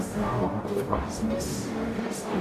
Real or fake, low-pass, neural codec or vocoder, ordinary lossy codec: fake; 14.4 kHz; codec, 44.1 kHz, 0.9 kbps, DAC; AAC, 96 kbps